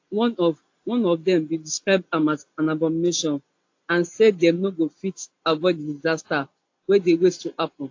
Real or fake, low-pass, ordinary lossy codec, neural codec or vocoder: real; 7.2 kHz; AAC, 48 kbps; none